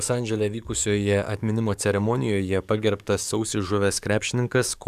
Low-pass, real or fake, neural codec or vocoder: 14.4 kHz; fake; codec, 44.1 kHz, 7.8 kbps, DAC